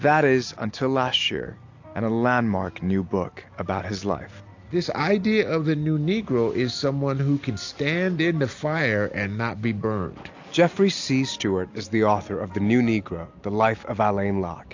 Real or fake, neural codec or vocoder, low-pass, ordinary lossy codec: real; none; 7.2 kHz; AAC, 48 kbps